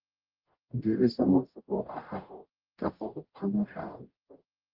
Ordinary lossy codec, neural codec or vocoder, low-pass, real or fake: Opus, 32 kbps; codec, 44.1 kHz, 0.9 kbps, DAC; 5.4 kHz; fake